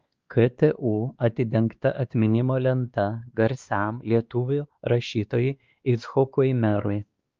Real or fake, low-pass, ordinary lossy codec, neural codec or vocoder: fake; 7.2 kHz; Opus, 24 kbps; codec, 16 kHz, 2 kbps, X-Codec, WavLM features, trained on Multilingual LibriSpeech